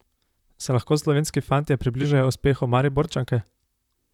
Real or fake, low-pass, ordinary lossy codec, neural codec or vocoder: fake; 19.8 kHz; none; vocoder, 44.1 kHz, 128 mel bands, Pupu-Vocoder